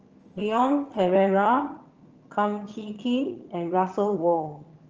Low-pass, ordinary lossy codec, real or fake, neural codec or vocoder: 7.2 kHz; Opus, 24 kbps; fake; vocoder, 22.05 kHz, 80 mel bands, HiFi-GAN